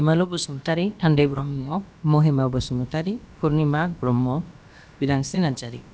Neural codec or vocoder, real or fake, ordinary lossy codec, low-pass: codec, 16 kHz, about 1 kbps, DyCAST, with the encoder's durations; fake; none; none